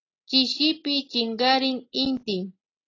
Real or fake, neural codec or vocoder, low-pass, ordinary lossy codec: real; none; 7.2 kHz; AAC, 32 kbps